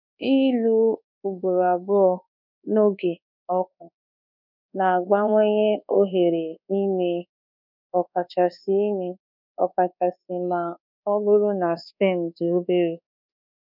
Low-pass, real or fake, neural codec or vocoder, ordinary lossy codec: 5.4 kHz; fake; codec, 24 kHz, 1.2 kbps, DualCodec; none